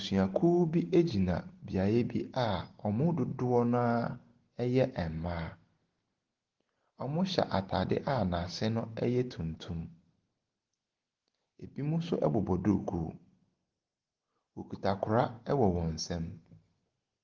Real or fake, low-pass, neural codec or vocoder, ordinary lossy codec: real; 7.2 kHz; none; Opus, 16 kbps